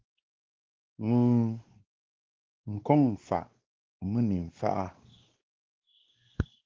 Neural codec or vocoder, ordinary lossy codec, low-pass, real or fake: codec, 16 kHz, 2 kbps, X-Codec, WavLM features, trained on Multilingual LibriSpeech; Opus, 16 kbps; 7.2 kHz; fake